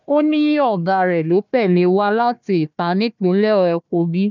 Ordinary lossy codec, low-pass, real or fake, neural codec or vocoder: none; 7.2 kHz; fake; codec, 16 kHz, 1 kbps, FunCodec, trained on Chinese and English, 50 frames a second